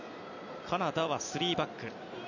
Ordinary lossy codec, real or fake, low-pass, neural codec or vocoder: none; real; 7.2 kHz; none